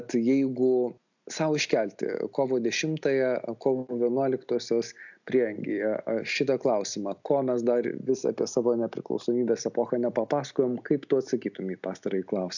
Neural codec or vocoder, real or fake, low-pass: none; real; 7.2 kHz